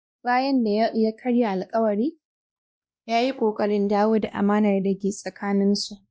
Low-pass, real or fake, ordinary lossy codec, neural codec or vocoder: none; fake; none; codec, 16 kHz, 1 kbps, X-Codec, WavLM features, trained on Multilingual LibriSpeech